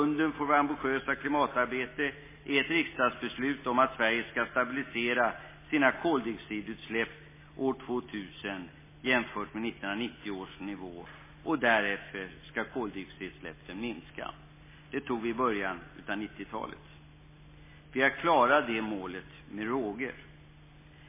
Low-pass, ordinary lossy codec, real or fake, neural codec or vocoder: 3.6 kHz; MP3, 16 kbps; real; none